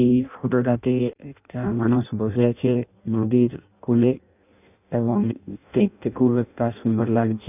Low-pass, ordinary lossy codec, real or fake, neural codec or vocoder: 3.6 kHz; none; fake; codec, 16 kHz in and 24 kHz out, 0.6 kbps, FireRedTTS-2 codec